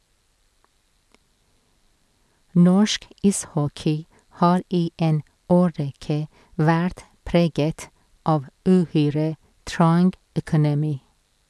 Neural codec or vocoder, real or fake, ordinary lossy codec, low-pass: none; real; none; none